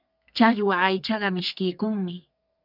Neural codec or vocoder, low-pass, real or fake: codec, 32 kHz, 1.9 kbps, SNAC; 5.4 kHz; fake